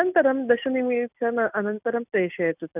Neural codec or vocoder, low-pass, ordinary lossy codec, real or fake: none; 3.6 kHz; none; real